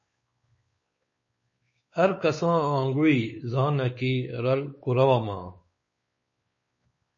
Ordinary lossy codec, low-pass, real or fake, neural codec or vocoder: MP3, 32 kbps; 7.2 kHz; fake; codec, 16 kHz, 4 kbps, X-Codec, WavLM features, trained on Multilingual LibriSpeech